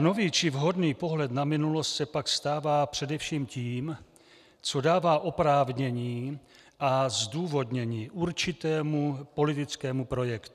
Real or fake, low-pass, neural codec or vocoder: real; 14.4 kHz; none